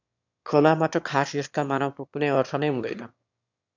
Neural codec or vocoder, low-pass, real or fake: autoencoder, 22.05 kHz, a latent of 192 numbers a frame, VITS, trained on one speaker; 7.2 kHz; fake